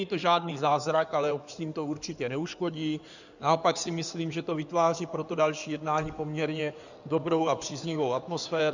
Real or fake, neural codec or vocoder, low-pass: fake; codec, 16 kHz in and 24 kHz out, 2.2 kbps, FireRedTTS-2 codec; 7.2 kHz